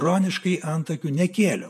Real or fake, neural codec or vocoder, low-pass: fake; vocoder, 48 kHz, 128 mel bands, Vocos; 14.4 kHz